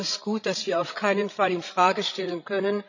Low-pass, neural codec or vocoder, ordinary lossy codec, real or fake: 7.2 kHz; codec, 16 kHz, 16 kbps, FreqCodec, larger model; none; fake